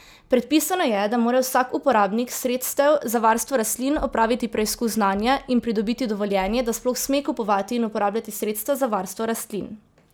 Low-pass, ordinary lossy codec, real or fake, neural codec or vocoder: none; none; real; none